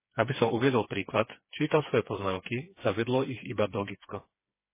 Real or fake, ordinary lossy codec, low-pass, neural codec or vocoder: fake; MP3, 16 kbps; 3.6 kHz; codec, 16 kHz, 4 kbps, FreqCodec, smaller model